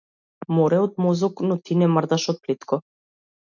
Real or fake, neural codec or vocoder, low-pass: real; none; 7.2 kHz